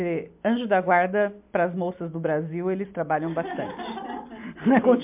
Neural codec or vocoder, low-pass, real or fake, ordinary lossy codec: none; 3.6 kHz; real; MP3, 32 kbps